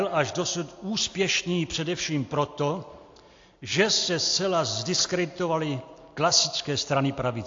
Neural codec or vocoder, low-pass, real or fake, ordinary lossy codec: none; 7.2 kHz; real; AAC, 48 kbps